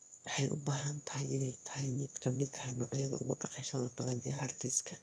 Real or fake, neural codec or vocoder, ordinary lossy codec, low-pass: fake; autoencoder, 22.05 kHz, a latent of 192 numbers a frame, VITS, trained on one speaker; none; none